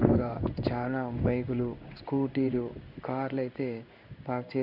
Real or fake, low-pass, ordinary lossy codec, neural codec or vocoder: fake; 5.4 kHz; none; codec, 16 kHz in and 24 kHz out, 1 kbps, XY-Tokenizer